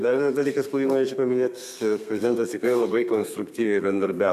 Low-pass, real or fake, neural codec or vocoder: 14.4 kHz; fake; codec, 32 kHz, 1.9 kbps, SNAC